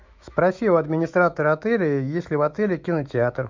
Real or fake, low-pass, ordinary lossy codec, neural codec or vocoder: fake; 7.2 kHz; MP3, 64 kbps; autoencoder, 48 kHz, 128 numbers a frame, DAC-VAE, trained on Japanese speech